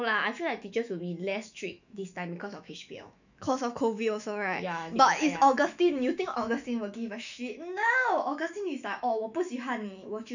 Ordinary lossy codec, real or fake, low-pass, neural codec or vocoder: none; fake; 7.2 kHz; autoencoder, 48 kHz, 128 numbers a frame, DAC-VAE, trained on Japanese speech